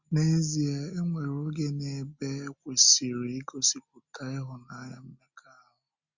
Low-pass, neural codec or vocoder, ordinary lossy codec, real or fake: 7.2 kHz; none; Opus, 64 kbps; real